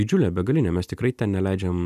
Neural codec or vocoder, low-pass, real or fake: none; 14.4 kHz; real